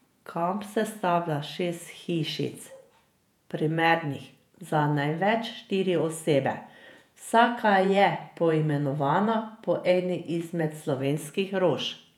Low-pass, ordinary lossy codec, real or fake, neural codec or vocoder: 19.8 kHz; none; fake; vocoder, 48 kHz, 128 mel bands, Vocos